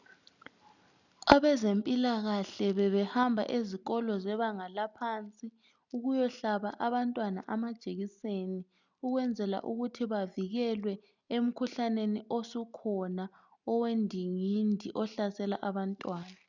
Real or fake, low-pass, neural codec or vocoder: real; 7.2 kHz; none